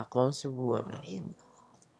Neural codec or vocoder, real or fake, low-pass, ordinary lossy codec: autoencoder, 22.05 kHz, a latent of 192 numbers a frame, VITS, trained on one speaker; fake; none; none